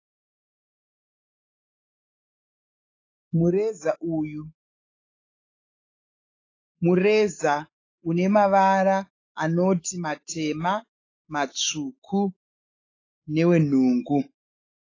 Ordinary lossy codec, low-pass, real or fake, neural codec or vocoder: AAC, 32 kbps; 7.2 kHz; fake; autoencoder, 48 kHz, 128 numbers a frame, DAC-VAE, trained on Japanese speech